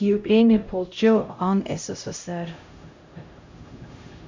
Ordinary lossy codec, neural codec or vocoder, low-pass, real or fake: MP3, 64 kbps; codec, 16 kHz, 0.5 kbps, X-Codec, HuBERT features, trained on LibriSpeech; 7.2 kHz; fake